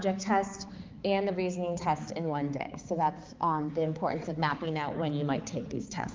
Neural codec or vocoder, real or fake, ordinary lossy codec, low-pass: codec, 16 kHz, 4 kbps, X-Codec, HuBERT features, trained on balanced general audio; fake; Opus, 24 kbps; 7.2 kHz